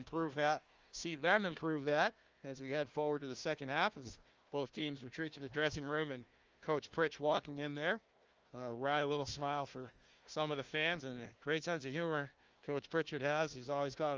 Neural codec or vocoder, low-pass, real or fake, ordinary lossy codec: codec, 16 kHz, 1 kbps, FunCodec, trained on Chinese and English, 50 frames a second; 7.2 kHz; fake; Opus, 32 kbps